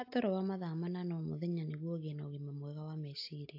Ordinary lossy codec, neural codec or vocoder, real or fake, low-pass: AAC, 48 kbps; none; real; 5.4 kHz